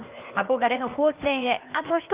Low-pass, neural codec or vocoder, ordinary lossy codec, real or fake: 3.6 kHz; codec, 16 kHz, 0.8 kbps, ZipCodec; Opus, 32 kbps; fake